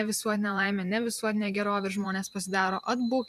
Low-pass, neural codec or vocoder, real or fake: 14.4 kHz; vocoder, 44.1 kHz, 128 mel bands every 512 samples, BigVGAN v2; fake